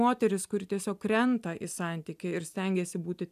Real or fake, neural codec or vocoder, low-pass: real; none; 14.4 kHz